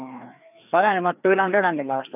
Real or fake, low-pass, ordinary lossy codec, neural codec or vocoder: fake; 3.6 kHz; none; codec, 16 kHz, 2 kbps, FreqCodec, larger model